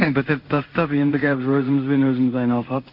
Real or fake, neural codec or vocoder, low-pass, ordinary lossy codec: fake; codec, 16 kHz in and 24 kHz out, 1 kbps, XY-Tokenizer; 5.4 kHz; none